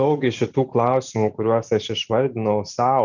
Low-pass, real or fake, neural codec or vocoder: 7.2 kHz; real; none